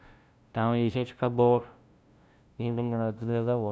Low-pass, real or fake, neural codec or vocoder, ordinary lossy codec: none; fake; codec, 16 kHz, 0.5 kbps, FunCodec, trained on LibriTTS, 25 frames a second; none